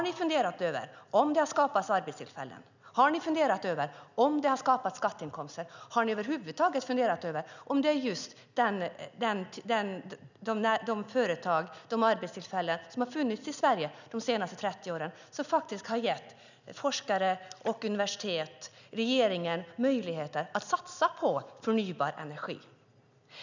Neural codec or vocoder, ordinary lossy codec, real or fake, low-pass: none; none; real; 7.2 kHz